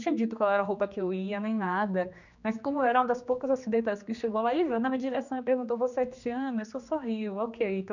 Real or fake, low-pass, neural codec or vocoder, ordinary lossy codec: fake; 7.2 kHz; codec, 16 kHz, 2 kbps, X-Codec, HuBERT features, trained on general audio; none